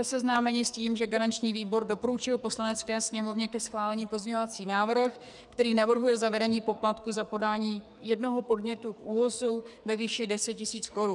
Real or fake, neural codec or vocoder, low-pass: fake; codec, 44.1 kHz, 2.6 kbps, SNAC; 10.8 kHz